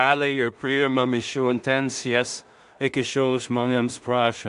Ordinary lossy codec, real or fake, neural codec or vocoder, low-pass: AAC, 96 kbps; fake; codec, 16 kHz in and 24 kHz out, 0.4 kbps, LongCat-Audio-Codec, two codebook decoder; 10.8 kHz